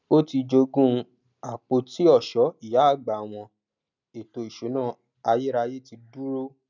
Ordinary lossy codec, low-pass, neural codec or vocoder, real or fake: none; 7.2 kHz; none; real